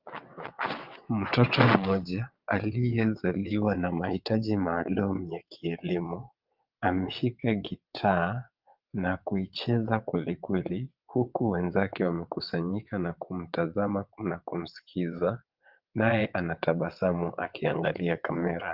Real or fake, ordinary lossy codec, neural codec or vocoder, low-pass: fake; Opus, 24 kbps; vocoder, 22.05 kHz, 80 mel bands, WaveNeXt; 5.4 kHz